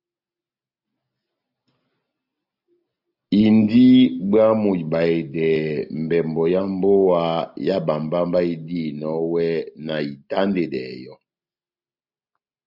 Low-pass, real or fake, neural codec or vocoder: 5.4 kHz; real; none